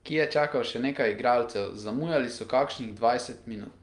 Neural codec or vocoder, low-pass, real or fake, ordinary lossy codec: none; 10.8 kHz; real; Opus, 32 kbps